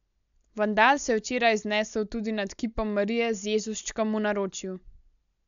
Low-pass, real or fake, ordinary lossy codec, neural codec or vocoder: 7.2 kHz; real; none; none